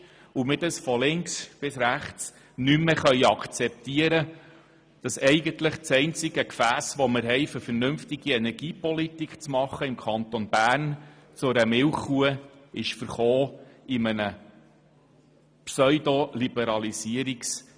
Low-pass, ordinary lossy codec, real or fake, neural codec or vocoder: none; none; real; none